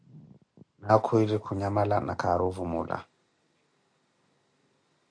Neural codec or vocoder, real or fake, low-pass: none; real; 9.9 kHz